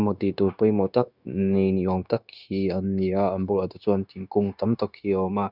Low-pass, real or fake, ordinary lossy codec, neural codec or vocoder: 5.4 kHz; fake; none; codec, 16 kHz, 0.9 kbps, LongCat-Audio-Codec